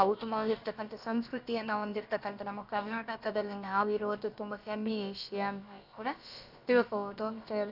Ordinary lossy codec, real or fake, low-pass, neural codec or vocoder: none; fake; 5.4 kHz; codec, 16 kHz, about 1 kbps, DyCAST, with the encoder's durations